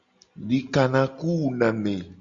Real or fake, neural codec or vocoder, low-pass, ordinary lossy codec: real; none; 7.2 kHz; Opus, 64 kbps